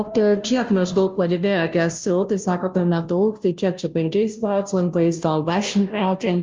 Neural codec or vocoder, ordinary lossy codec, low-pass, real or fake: codec, 16 kHz, 0.5 kbps, FunCodec, trained on Chinese and English, 25 frames a second; Opus, 32 kbps; 7.2 kHz; fake